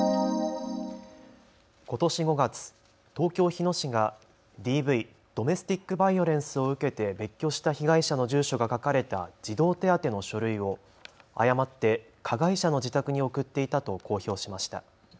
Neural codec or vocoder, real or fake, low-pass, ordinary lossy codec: none; real; none; none